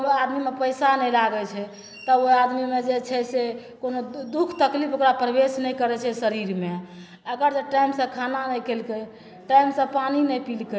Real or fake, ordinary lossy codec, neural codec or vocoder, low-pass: real; none; none; none